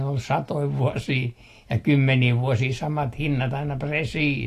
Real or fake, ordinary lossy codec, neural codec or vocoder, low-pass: real; AAC, 48 kbps; none; 14.4 kHz